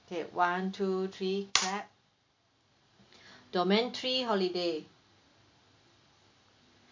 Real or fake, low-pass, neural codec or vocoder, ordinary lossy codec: real; 7.2 kHz; none; MP3, 64 kbps